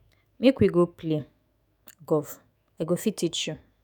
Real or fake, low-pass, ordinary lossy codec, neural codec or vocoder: fake; none; none; autoencoder, 48 kHz, 128 numbers a frame, DAC-VAE, trained on Japanese speech